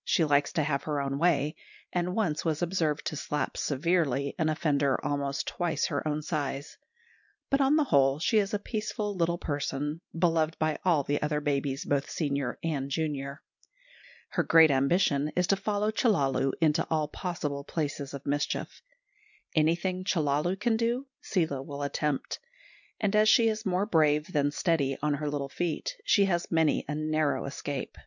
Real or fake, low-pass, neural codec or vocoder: real; 7.2 kHz; none